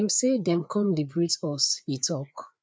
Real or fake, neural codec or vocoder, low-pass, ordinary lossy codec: fake; codec, 16 kHz, 4 kbps, FreqCodec, larger model; none; none